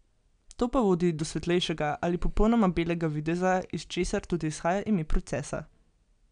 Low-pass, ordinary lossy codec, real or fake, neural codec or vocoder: 9.9 kHz; none; real; none